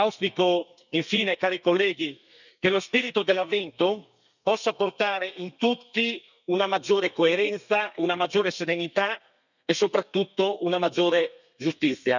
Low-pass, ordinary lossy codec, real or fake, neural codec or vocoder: 7.2 kHz; none; fake; codec, 32 kHz, 1.9 kbps, SNAC